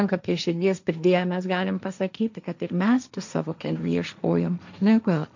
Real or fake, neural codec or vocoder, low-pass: fake; codec, 16 kHz, 1.1 kbps, Voila-Tokenizer; 7.2 kHz